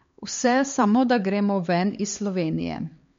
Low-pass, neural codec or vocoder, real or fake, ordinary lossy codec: 7.2 kHz; codec, 16 kHz, 4 kbps, X-Codec, HuBERT features, trained on LibriSpeech; fake; MP3, 48 kbps